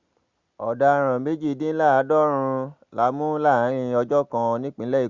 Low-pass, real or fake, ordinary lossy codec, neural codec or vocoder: 7.2 kHz; real; Opus, 64 kbps; none